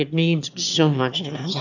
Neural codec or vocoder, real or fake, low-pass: autoencoder, 22.05 kHz, a latent of 192 numbers a frame, VITS, trained on one speaker; fake; 7.2 kHz